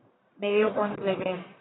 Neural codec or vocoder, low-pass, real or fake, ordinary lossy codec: codec, 44.1 kHz, 1.7 kbps, Pupu-Codec; 7.2 kHz; fake; AAC, 16 kbps